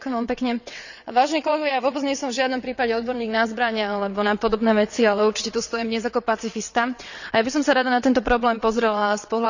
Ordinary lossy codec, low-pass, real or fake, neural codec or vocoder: none; 7.2 kHz; fake; vocoder, 22.05 kHz, 80 mel bands, WaveNeXt